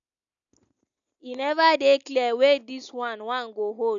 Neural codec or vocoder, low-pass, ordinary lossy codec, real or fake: none; 7.2 kHz; none; real